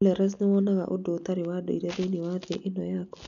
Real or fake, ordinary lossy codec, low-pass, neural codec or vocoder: real; none; 7.2 kHz; none